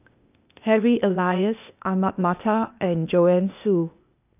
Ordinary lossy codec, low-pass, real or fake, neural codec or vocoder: none; 3.6 kHz; fake; codec, 16 kHz, 0.8 kbps, ZipCodec